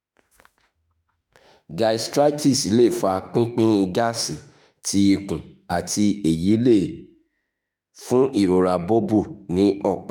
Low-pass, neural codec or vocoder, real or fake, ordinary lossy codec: none; autoencoder, 48 kHz, 32 numbers a frame, DAC-VAE, trained on Japanese speech; fake; none